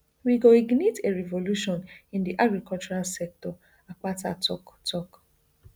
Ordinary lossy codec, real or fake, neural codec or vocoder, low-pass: none; real; none; none